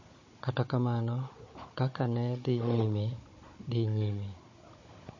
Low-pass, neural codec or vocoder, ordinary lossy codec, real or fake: 7.2 kHz; codec, 16 kHz, 16 kbps, FunCodec, trained on Chinese and English, 50 frames a second; MP3, 32 kbps; fake